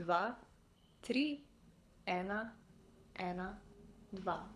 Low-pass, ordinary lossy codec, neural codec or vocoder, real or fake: none; none; codec, 24 kHz, 6 kbps, HILCodec; fake